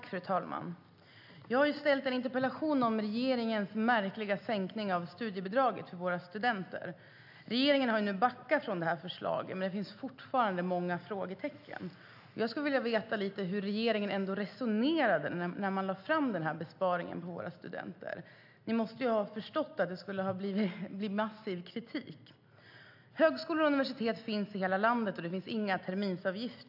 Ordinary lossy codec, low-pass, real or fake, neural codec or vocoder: AAC, 48 kbps; 5.4 kHz; real; none